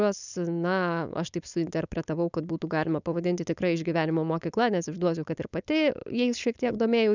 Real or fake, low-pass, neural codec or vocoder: fake; 7.2 kHz; codec, 16 kHz, 4.8 kbps, FACodec